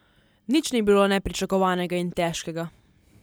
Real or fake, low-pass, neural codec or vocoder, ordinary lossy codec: real; none; none; none